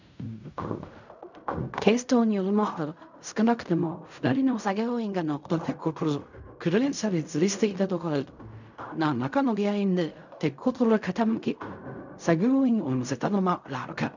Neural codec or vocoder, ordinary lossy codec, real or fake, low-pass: codec, 16 kHz in and 24 kHz out, 0.4 kbps, LongCat-Audio-Codec, fine tuned four codebook decoder; none; fake; 7.2 kHz